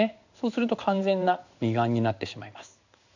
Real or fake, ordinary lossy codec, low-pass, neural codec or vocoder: fake; none; 7.2 kHz; codec, 16 kHz in and 24 kHz out, 1 kbps, XY-Tokenizer